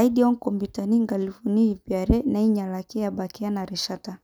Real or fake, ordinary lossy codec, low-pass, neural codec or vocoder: real; none; none; none